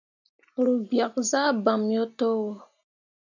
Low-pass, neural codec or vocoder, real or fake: 7.2 kHz; none; real